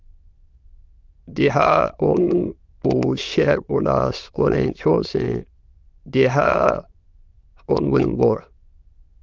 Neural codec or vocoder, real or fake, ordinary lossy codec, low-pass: autoencoder, 22.05 kHz, a latent of 192 numbers a frame, VITS, trained on many speakers; fake; Opus, 24 kbps; 7.2 kHz